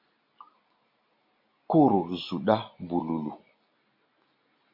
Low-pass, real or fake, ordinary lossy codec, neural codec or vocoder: 5.4 kHz; real; MP3, 32 kbps; none